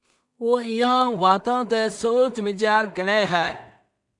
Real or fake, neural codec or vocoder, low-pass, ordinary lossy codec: fake; codec, 16 kHz in and 24 kHz out, 0.4 kbps, LongCat-Audio-Codec, two codebook decoder; 10.8 kHz; MP3, 96 kbps